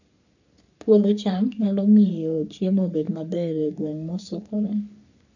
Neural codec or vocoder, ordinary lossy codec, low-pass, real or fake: codec, 44.1 kHz, 3.4 kbps, Pupu-Codec; none; 7.2 kHz; fake